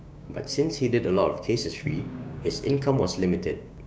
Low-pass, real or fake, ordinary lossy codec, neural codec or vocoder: none; fake; none; codec, 16 kHz, 6 kbps, DAC